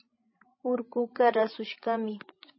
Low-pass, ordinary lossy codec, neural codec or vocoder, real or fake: 7.2 kHz; MP3, 24 kbps; codec, 16 kHz, 16 kbps, FreqCodec, larger model; fake